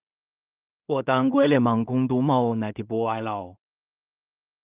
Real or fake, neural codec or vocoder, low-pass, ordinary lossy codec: fake; codec, 16 kHz in and 24 kHz out, 0.4 kbps, LongCat-Audio-Codec, two codebook decoder; 3.6 kHz; Opus, 24 kbps